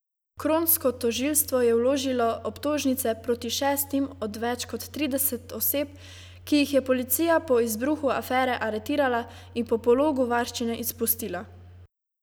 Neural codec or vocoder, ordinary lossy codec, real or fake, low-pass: none; none; real; none